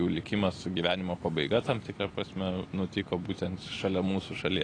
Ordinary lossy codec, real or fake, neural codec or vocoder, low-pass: AAC, 32 kbps; real; none; 9.9 kHz